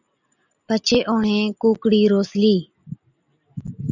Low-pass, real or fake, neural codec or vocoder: 7.2 kHz; real; none